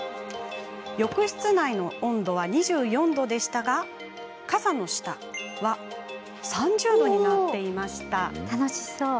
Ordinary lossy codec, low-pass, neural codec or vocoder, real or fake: none; none; none; real